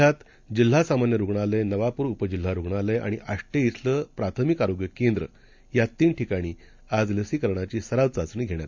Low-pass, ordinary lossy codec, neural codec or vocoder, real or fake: 7.2 kHz; MP3, 64 kbps; none; real